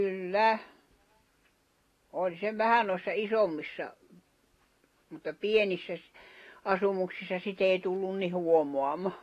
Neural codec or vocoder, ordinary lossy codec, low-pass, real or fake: none; AAC, 48 kbps; 14.4 kHz; real